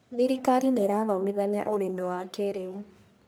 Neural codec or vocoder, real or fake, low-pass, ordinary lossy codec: codec, 44.1 kHz, 1.7 kbps, Pupu-Codec; fake; none; none